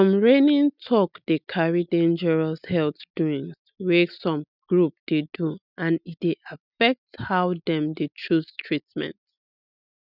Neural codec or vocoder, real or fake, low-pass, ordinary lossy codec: none; real; 5.4 kHz; none